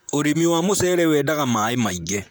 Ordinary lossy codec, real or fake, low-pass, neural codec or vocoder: none; fake; none; vocoder, 44.1 kHz, 128 mel bands, Pupu-Vocoder